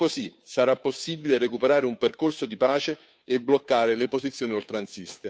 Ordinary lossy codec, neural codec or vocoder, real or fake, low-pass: none; codec, 16 kHz, 2 kbps, FunCodec, trained on Chinese and English, 25 frames a second; fake; none